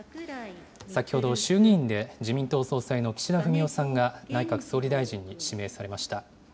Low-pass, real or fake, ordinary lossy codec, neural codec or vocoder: none; real; none; none